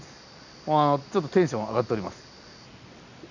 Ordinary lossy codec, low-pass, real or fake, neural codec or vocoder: none; 7.2 kHz; real; none